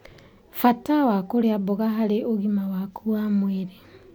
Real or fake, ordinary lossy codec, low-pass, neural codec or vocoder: real; none; 19.8 kHz; none